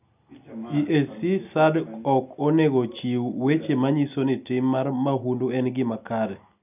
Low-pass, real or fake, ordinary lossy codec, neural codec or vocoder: 3.6 kHz; real; none; none